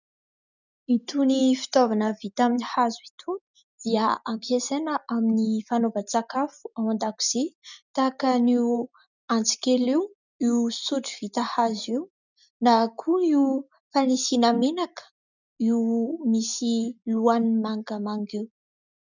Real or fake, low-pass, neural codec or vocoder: real; 7.2 kHz; none